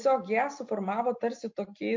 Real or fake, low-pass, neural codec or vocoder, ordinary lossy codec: real; 7.2 kHz; none; MP3, 48 kbps